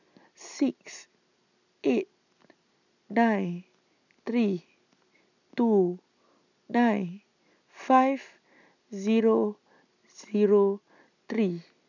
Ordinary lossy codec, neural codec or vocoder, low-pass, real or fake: none; none; 7.2 kHz; real